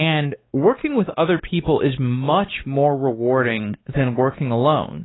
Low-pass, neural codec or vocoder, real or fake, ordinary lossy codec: 7.2 kHz; codec, 16 kHz, 2 kbps, X-Codec, WavLM features, trained on Multilingual LibriSpeech; fake; AAC, 16 kbps